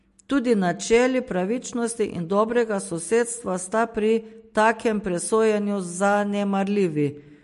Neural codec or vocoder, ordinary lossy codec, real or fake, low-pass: none; MP3, 48 kbps; real; 14.4 kHz